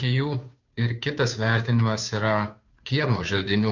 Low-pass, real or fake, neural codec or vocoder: 7.2 kHz; fake; codec, 16 kHz, 2 kbps, FunCodec, trained on Chinese and English, 25 frames a second